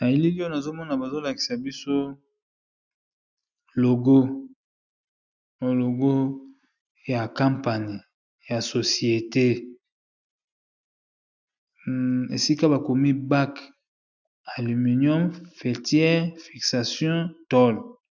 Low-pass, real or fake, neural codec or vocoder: 7.2 kHz; real; none